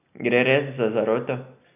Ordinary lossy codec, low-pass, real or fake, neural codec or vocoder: none; 3.6 kHz; real; none